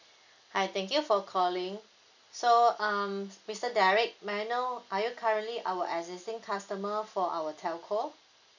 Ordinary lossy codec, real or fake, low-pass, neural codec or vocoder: none; real; 7.2 kHz; none